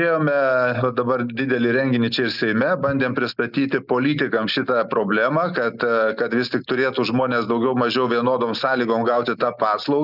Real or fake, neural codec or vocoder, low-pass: real; none; 5.4 kHz